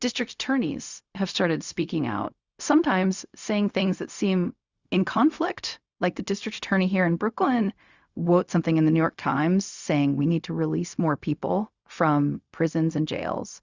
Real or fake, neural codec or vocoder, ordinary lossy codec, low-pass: fake; codec, 16 kHz, 0.4 kbps, LongCat-Audio-Codec; Opus, 64 kbps; 7.2 kHz